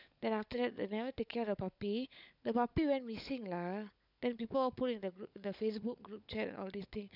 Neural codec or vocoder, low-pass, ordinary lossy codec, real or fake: codec, 16 kHz, 16 kbps, FunCodec, trained on LibriTTS, 50 frames a second; 5.4 kHz; none; fake